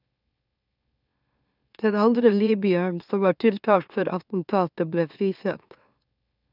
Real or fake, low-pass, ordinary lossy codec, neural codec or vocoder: fake; 5.4 kHz; none; autoencoder, 44.1 kHz, a latent of 192 numbers a frame, MeloTTS